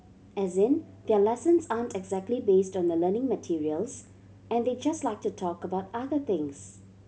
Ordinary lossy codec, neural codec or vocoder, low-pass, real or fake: none; none; none; real